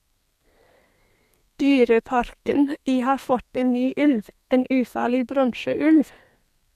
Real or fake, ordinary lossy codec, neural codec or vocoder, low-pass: fake; none; codec, 32 kHz, 1.9 kbps, SNAC; 14.4 kHz